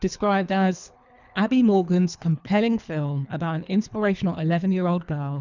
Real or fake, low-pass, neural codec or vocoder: fake; 7.2 kHz; codec, 24 kHz, 3 kbps, HILCodec